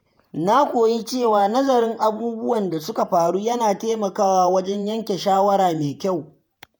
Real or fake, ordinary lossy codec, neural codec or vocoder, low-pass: fake; none; vocoder, 48 kHz, 128 mel bands, Vocos; none